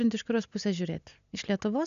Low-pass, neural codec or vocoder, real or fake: 7.2 kHz; none; real